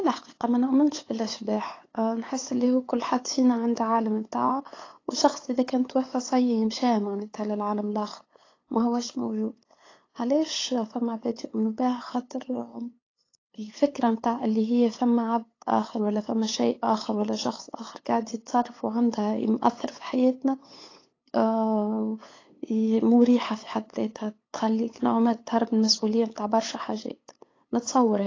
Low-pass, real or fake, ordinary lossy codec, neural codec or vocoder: 7.2 kHz; fake; AAC, 32 kbps; codec, 16 kHz, 8 kbps, FunCodec, trained on LibriTTS, 25 frames a second